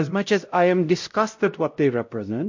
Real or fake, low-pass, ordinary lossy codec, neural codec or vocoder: fake; 7.2 kHz; MP3, 48 kbps; codec, 16 kHz, 0.5 kbps, X-Codec, WavLM features, trained on Multilingual LibriSpeech